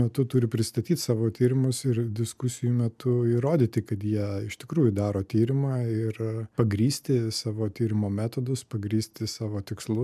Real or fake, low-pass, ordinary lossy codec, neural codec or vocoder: real; 14.4 kHz; MP3, 96 kbps; none